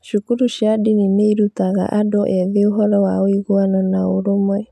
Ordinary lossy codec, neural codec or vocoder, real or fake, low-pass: none; none; real; 14.4 kHz